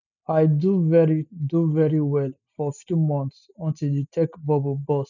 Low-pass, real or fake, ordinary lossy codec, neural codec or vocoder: 7.2 kHz; real; none; none